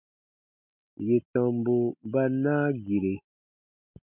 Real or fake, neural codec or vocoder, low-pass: real; none; 3.6 kHz